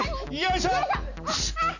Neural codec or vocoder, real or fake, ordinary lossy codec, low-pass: none; real; none; 7.2 kHz